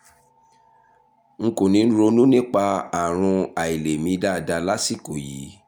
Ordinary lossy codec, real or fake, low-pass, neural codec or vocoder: none; real; 19.8 kHz; none